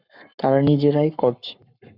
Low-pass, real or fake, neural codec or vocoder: 5.4 kHz; fake; codec, 44.1 kHz, 7.8 kbps, Pupu-Codec